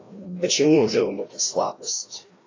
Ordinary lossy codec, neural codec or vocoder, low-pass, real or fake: AAC, 32 kbps; codec, 16 kHz, 1 kbps, FreqCodec, larger model; 7.2 kHz; fake